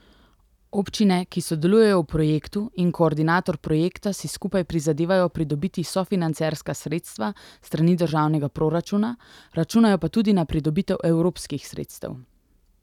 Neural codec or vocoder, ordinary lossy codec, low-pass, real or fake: none; none; 19.8 kHz; real